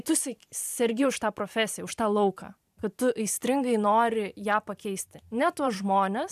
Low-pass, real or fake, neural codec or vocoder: 14.4 kHz; fake; vocoder, 44.1 kHz, 128 mel bands every 512 samples, BigVGAN v2